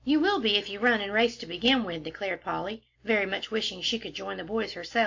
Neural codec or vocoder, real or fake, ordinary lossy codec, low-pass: none; real; AAC, 48 kbps; 7.2 kHz